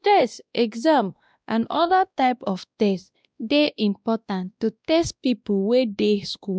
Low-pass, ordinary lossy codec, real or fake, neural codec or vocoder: none; none; fake; codec, 16 kHz, 1 kbps, X-Codec, WavLM features, trained on Multilingual LibriSpeech